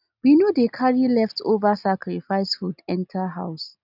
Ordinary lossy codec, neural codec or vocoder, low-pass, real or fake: none; none; 5.4 kHz; real